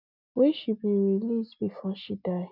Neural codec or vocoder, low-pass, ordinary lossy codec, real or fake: none; 5.4 kHz; none; real